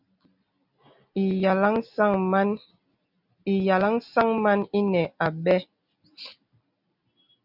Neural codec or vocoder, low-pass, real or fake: none; 5.4 kHz; real